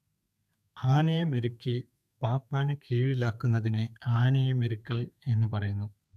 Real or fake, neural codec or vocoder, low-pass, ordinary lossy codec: fake; codec, 32 kHz, 1.9 kbps, SNAC; 14.4 kHz; none